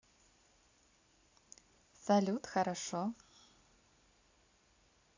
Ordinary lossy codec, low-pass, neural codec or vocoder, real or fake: AAC, 48 kbps; 7.2 kHz; none; real